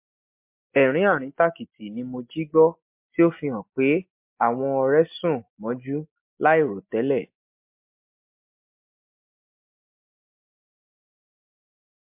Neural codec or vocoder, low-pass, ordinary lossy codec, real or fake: none; 3.6 kHz; MP3, 32 kbps; real